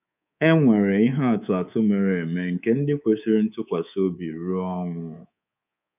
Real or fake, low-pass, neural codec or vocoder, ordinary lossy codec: fake; 3.6 kHz; codec, 24 kHz, 3.1 kbps, DualCodec; none